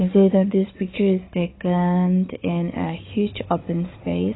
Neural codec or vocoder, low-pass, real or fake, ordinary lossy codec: codec, 16 kHz, 4 kbps, FunCodec, trained on Chinese and English, 50 frames a second; 7.2 kHz; fake; AAC, 16 kbps